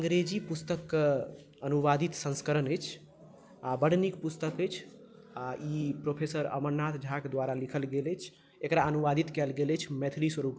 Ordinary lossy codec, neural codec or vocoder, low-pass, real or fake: none; none; none; real